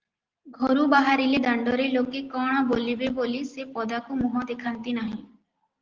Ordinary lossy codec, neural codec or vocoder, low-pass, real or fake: Opus, 16 kbps; none; 7.2 kHz; real